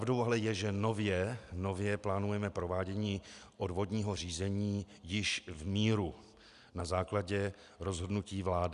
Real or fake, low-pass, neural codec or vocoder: real; 10.8 kHz; none